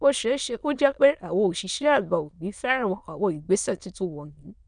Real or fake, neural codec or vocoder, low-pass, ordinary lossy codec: fake; autoencoder, 22.05 kHz, a latent of 192 numbers a frame, VITS, trained on many speakers; 9.9 kHz; none